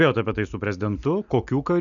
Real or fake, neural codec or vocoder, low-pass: real; none; 7.2 kHz